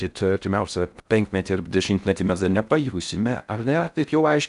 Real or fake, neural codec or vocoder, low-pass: fake; codec, 16 kHz in and 24 kHz out, 0.6 kbps, FocalCodec, streaming, 4096 codes; 10.8 kHz